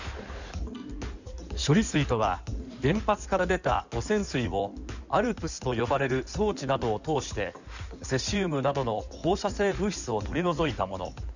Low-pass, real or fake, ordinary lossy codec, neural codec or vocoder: 7.2 kHz; fake; none; codec, 16 kHz in and 24 kHz out, 2.2 kbps, FireRedTTS-2 codec